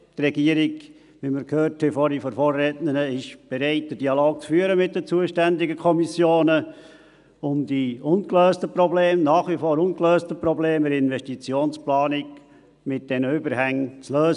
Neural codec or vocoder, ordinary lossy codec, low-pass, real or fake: none; none; 10.8 kHz; real